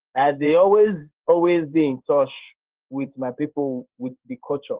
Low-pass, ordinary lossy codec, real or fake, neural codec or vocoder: 3.6 kHz; Opus, 16 kbps; fake; codec, 16 kHz in and 24 kHz out, 1 kbps, XY-Tokenizer